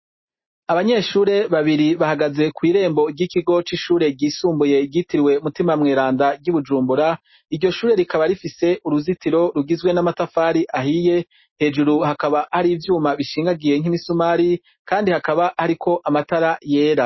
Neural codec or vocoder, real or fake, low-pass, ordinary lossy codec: none; real; 7.2 kHz; MP3, 24 kbps